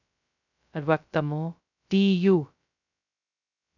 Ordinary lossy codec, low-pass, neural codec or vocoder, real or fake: none; 7.2 kHz; codec, 16 kHz, 0.2 kbps, FocalCodec; fake